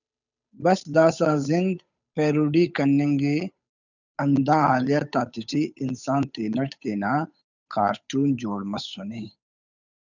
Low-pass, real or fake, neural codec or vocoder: 7.2 kHz; fake; codec, 16 kHz, 8 kbps, FunCodec, trained on Chinese and English, 25 frames a second